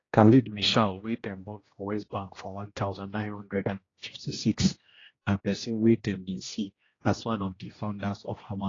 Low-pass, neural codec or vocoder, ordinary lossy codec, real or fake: 7.2 kHz; codec, 16 kHz, 1 kbps, X-Codec, HuBERT features, trained on general audio; AAC, 32 kbps; fake